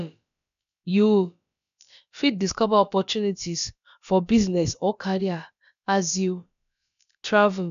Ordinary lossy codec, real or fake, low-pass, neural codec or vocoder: none; fake; 7.2 kHz; codec, 16 kHz, about 1 kbps, DyCAST, with the encoder's durations